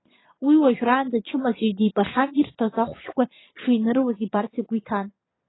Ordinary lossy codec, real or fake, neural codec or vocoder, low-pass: AAC, 16 kbps; fake; vocoder, 22.05 kHz, 80 mel bands, Vocos; 7.2 kHz